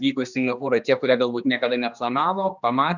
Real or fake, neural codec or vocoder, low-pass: fake; codec, 16 kHz, 2 kbps, X-Codec, HuBERT features, trained on general audio; 7.2 kHz